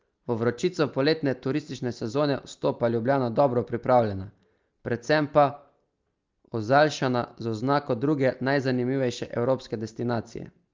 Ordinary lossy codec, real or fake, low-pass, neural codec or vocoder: Opus, 24 kbps; real; 7.2 kHz; none